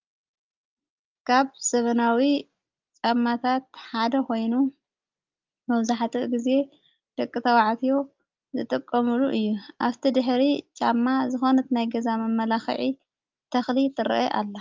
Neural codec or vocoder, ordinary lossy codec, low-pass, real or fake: none; Opus, 24 kbps; 7.2 kHz; real